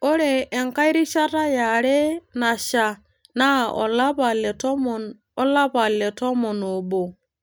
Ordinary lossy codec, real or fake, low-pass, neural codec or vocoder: none; real; none; none